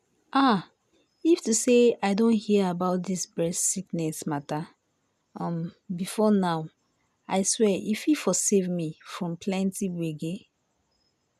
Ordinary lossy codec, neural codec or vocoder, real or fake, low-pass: none; none; real; 14.4 kHz